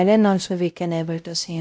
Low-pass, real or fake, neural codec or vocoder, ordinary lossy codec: none; fake; codec, 16 kHz, 0.5 kbps, X-Codec, WavLM features, trained on Multilingual LibriSpeech; none